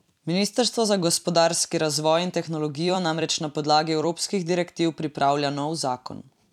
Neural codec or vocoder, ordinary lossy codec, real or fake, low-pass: vocoder, 44.1 kHz, 128 mel bands every 256 samples, BigVGAN v2; none; fake; 19.8 kHz